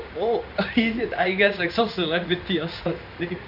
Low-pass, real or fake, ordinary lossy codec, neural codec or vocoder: 5.4 kHz; fake; none; codec, 16 kHz in and 24 kHz out, 1 kbps, XY-Tokenizer